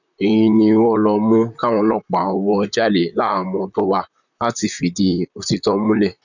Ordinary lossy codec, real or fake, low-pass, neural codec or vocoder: none; fake; 7.2 kHz; vocoder, 44.1 kHz, 128 mel bands, Pupu-Vocoder